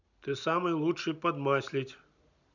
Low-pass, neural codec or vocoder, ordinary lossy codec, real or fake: 7.2 kHz; none; none; real